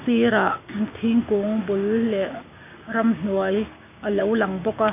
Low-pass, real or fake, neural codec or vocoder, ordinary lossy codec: 3.6 kHz; fake; codec, 16 kHz, 6 kbps, DAC; none